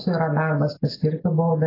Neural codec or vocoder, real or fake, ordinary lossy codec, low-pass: none; real; AAC, 24 kbps; 5.4 kHz